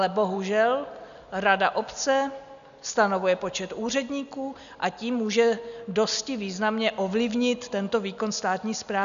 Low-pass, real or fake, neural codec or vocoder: 7.2 kHz; real; none